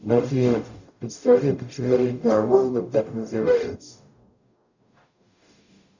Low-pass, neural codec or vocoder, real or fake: 7.2 kHz; codec, 44.1 kHz, 0.9 kbps, DAC; fake